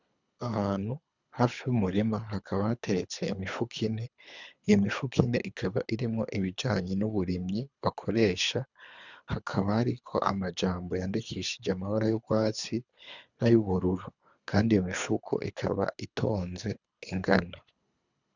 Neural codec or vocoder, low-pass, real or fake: codec, 24 kHz, 3 kbps, HILCodec; 7.2 kHz; fake